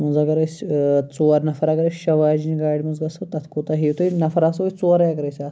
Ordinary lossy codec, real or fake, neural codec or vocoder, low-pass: none; real; none; none